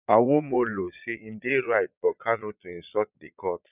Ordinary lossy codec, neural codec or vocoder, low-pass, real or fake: none; vocoder, 22.05 kHz, 80 mel bands, Vocos; 3.6 kHz; fake